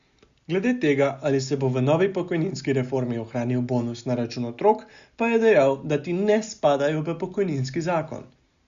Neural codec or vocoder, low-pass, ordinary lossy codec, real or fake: none; 7.2 kHz; Opus, 64 kbps; real